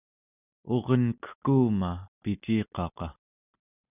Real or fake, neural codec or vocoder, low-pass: real; none; 3.6 kHz